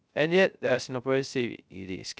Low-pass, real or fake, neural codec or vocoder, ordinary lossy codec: none; fake; codec, 16 kHz, 0.3 kbps, FocalCodec; none